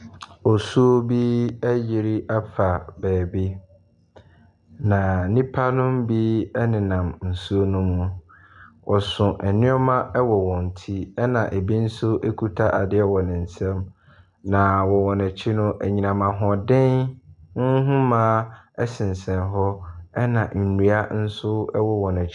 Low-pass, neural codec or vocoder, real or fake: 10.8 kHz; none; real